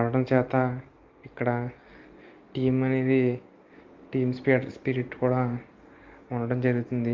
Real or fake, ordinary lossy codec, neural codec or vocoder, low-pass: real; Opus, 24 kbps; none; 7.2 kHz